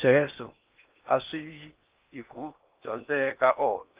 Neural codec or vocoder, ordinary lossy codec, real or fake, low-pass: codec, 16 kHz in and 24 kHz out, 0.6 kbps, FocalCodec, streaming, 4096 codes; Opus, 32 kbps; fake; 3.6 kHz